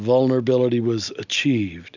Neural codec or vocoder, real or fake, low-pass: none; real; 7.2 kHz